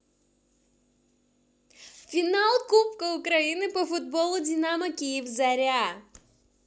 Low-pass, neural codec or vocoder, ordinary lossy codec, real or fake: none; none; none; real